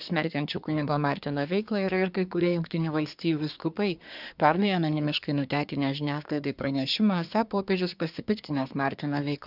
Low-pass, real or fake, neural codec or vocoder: 5.4 kHz; fake; codec, 24 kHz, 1 kbps, SNAC